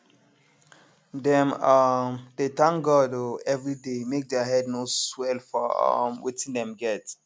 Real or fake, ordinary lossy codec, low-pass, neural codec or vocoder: real; none; none; none